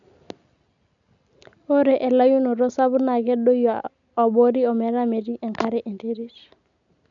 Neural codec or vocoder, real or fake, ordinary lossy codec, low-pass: none; real; none; 7.2 kHz